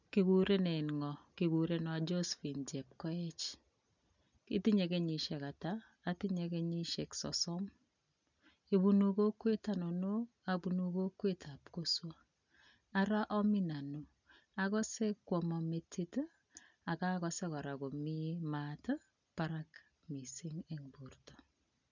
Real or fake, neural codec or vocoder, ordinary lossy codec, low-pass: real; none; none; 7.2 kHz